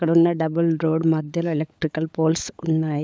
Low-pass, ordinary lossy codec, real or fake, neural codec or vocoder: none; none; fake; codec, 16 kHz, 16 kbps, FunCodec, trained on LibriTTS, 50 frames a second